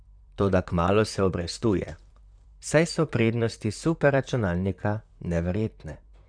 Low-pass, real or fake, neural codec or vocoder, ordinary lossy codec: 9.9 kHz; fake; vocoder, 44.1 kHz, 128 mel bands, Pupu-Vocoder; none